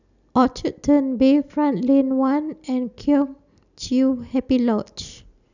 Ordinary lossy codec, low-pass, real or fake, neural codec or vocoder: none; 7.2 kHz; real; none